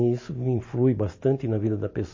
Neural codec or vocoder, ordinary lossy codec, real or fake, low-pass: none; MP3, 32 kbps; real; 7.2 kHz